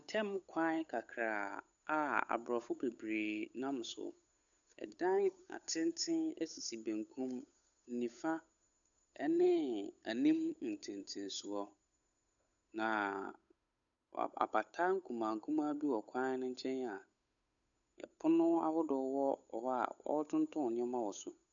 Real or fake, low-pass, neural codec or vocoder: fake; 7.2 kHz; codec, 16 kHz, 8 kbps, FunCodec, trained on Chinese and English, 25 frames a second